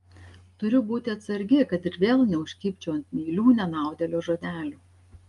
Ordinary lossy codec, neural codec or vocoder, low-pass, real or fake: Opus, 32 kbps; none; 10.8 kHz; real